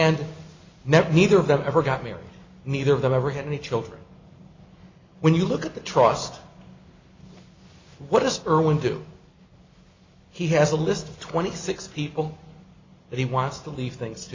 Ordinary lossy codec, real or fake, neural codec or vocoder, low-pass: MP3, 64 kbps; real; none; 7.2 kHz